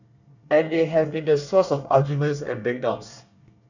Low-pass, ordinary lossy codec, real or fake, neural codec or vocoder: 7.2 kHz; none; fake; codec, 24 kHz, 1 kbps, SNAC